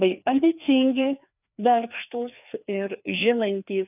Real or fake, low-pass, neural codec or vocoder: fake; 3.6 kHz; codec, 16 kHz, 2 kbps, FreqCodec, larger model